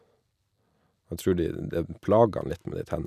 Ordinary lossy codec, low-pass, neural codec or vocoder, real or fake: none; 14.4 kHz; none; real